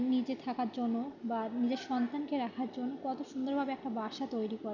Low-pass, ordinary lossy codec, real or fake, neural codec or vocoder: 7.2 kHz; none; real; none